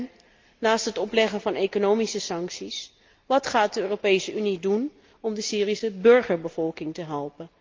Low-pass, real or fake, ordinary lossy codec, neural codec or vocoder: 7.2 kHz; real; Opus, 32 kbps; none